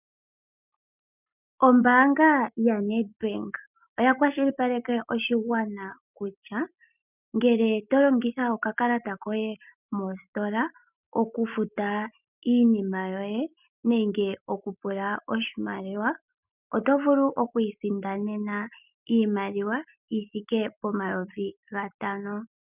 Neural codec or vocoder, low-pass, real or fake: none; 3.6 kHz; real